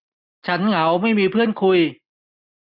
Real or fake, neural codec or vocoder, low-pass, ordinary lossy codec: real; none; 5.4 kHz; none